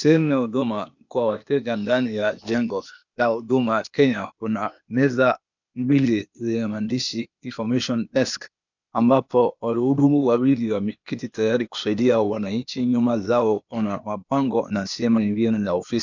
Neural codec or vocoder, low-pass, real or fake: codec, 16 kHz, 0.8 kbps, ZipCodec; 7.2 kHz; fake